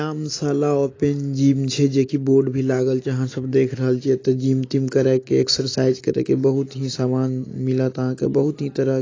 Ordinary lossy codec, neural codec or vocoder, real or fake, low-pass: AAC, 32 kbps; none; real; 7.2 kHz